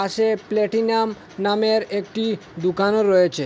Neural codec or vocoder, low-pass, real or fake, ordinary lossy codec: none; none; real; none